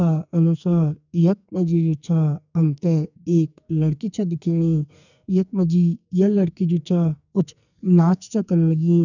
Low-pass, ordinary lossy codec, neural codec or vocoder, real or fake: 7.2 kHz; none; codec, 44.1 kHz, 2.6 kbps, SNAC; fake